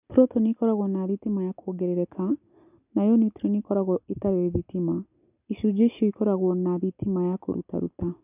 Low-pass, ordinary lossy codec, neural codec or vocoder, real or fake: 3.6 kHz; none; none; real